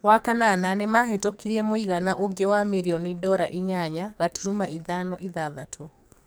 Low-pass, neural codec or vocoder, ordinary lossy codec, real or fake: none; codec, 44.1 kHz, 2.6 kbps, SNAC; none; fake